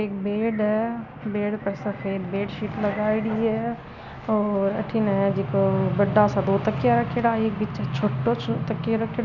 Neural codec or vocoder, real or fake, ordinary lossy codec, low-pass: none; real; none; 7.2 kHz